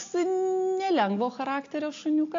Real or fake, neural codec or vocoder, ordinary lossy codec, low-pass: real; none; AAC, 48 kbps; 7.2 kHz